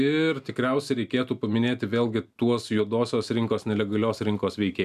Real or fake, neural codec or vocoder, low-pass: real; none; 14.4 kHz